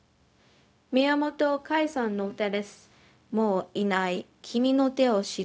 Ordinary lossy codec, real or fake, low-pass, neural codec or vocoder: none; fake; none; codec, 16 kHz, 0.4 kbps, LongCat-Audio-Codec